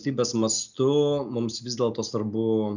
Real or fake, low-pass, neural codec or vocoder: real; 7.2 kHz; none